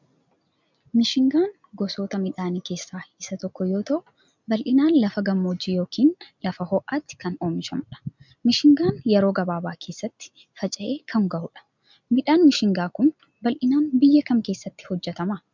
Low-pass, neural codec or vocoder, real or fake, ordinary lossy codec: 7.2 kHz; none; real; MP3, 64 kbps